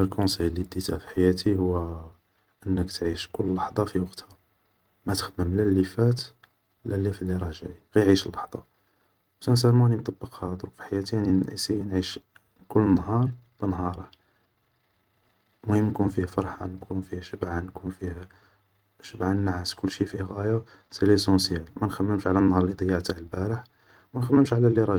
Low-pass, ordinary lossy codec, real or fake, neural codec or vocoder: 19.8 kHz; Opus, 32 kbps; fake; vocoder, 44.1 kHz, 128 mel bands every 256 samples, BigVGAN v2